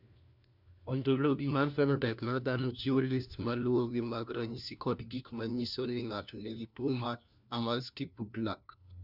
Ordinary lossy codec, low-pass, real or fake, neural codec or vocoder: none; 5.4 kHz; fake; codec, 16 kHz, 1 kbps, FunCodec, trained on LibriTTS, 50 frames a second